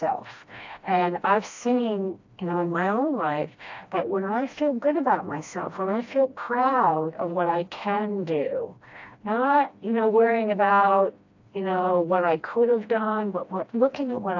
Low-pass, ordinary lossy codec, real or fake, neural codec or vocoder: 7.2 kHz; AAC, 48 kbps; fake; codec, 16 kHz, 1 kbps, FreqCodec, smaller model